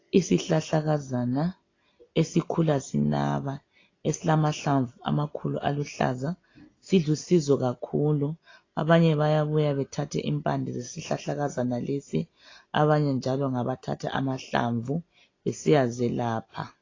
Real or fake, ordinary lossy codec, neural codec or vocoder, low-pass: real; AAC, 32 kbps; none; 7.2 kHz